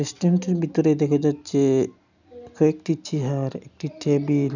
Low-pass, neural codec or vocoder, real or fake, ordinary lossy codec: 7.2 kHz; none; real; none